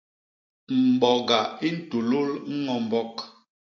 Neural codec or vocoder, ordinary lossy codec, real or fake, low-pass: none; AAC, 48 kbps; real; 7.2 kHz